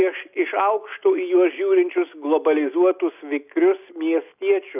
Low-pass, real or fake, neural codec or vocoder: 3.6 kHz; real; none